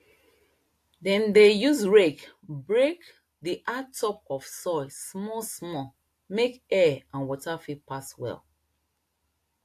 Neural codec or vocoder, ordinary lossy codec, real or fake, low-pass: none; AAC, 64 kbps; real; 14.4 kHz